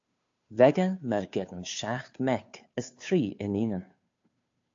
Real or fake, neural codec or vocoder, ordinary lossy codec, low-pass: fake; codec, 16 kHz, 2 kbps, FunCodec, trained on Chinese and English, 25 frames a second; AAC, 48 kbps; 7.2 kHz